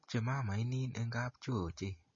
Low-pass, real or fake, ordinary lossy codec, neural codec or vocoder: 7.2 kHz; real; MP3, 32 kbps; none